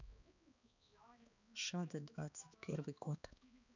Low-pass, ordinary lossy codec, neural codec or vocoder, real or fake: 7.2 kHz; none; codec, 16 kHz, 2 kbps, X-Codec, HuBERT features, trained on balanced general audio; fake